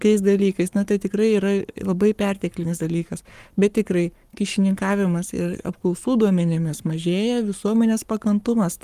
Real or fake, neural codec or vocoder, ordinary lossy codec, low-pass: fake; codec, 44.1 kHz, 7.8 kbps, Pupu-Codec; Opus, 32 kbps; 14.4 kHz